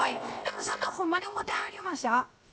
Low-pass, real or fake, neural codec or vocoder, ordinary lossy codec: none; fake; codec, 16 kHz, 0.7 kbps, FocalCodec; none